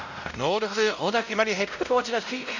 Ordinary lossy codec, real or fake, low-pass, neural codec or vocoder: none; fake; 7.2 kHz; codec, 16 kHz, 0.5 kbps, X-Codec, WavLM features, trained on Multilingual LibriSpeech